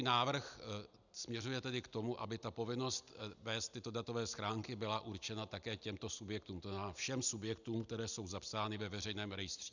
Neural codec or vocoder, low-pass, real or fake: none; 7.2 kHz; real